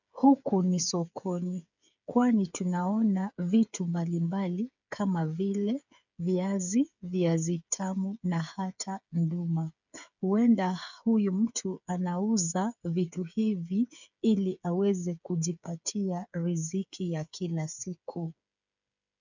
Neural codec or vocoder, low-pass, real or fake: codec, 16 kHz, 8 kbps, FreqCodec, smaller model; 7.2 kHz; fake